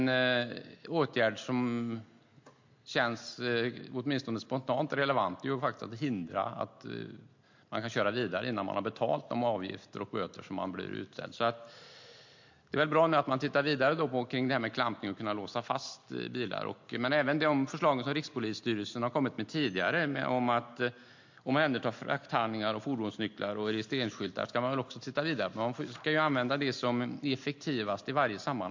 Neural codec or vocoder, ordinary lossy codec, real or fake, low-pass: none; MP3, 48 kbps; real; 7.2 kHz